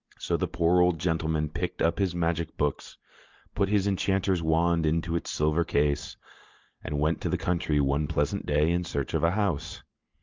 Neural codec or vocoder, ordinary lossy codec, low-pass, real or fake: none; Opus, 24 kbps; 7.2 kHz; real